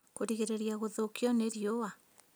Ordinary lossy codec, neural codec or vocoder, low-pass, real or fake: none; none; none; real